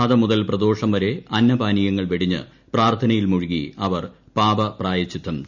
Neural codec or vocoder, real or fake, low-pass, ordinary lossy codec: none; real; 7.2 kHz; none